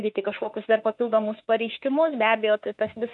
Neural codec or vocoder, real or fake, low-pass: autoencoder, 48 kHz, 32 numbers a frame, DAC-VAE, trained on Japanese speech; fake; 10.8 kHz